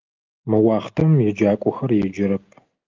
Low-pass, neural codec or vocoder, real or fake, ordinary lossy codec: 7.2 kHz; none; real; Opus, 24 kbps